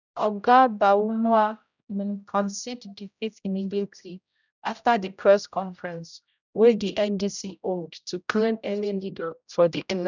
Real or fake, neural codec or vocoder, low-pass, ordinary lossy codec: fake; codec, 16 kHz, 0.5 kbps, X-Codec, HuBERT features, trained on general audio; 7.2 kHz; none